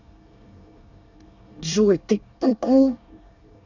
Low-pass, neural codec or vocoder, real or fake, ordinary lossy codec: 7.2 kHz; codec, 24 kHz, 1 kbps, SNAC; fake; none